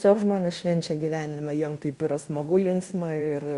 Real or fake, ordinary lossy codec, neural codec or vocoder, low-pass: fake; Opus, 64 kbps; codec, 16 kHz in and 24 kHz out, 0.9 kbps, LongCat-Audio-Codec, fine tuned four codebook decoder; 10.8 kHz